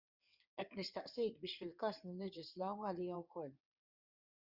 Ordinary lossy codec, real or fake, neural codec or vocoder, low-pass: Opus, 64 kbps; fake; codec, 16 kHz in and 24 kHz out, 2.2 kbps, FireRedTTS-2 codec; 5.4 kHz